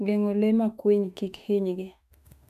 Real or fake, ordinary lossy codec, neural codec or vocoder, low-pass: fake; none; autoencoder, 48 kHz, 32 numbers a frame, DAC-VAE, trained on Japanese speech; 14.4 kHz